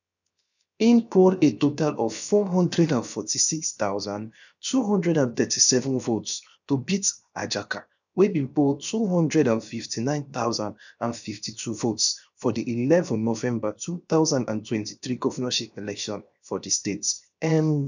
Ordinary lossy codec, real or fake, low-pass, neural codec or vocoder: none; fake; 7.2 kHz; codec, 16 kHz, 0.7 kbps, FocalCodec